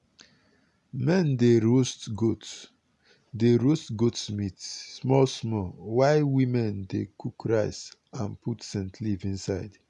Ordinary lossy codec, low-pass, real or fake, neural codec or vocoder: none; 10.8 kHz; real; none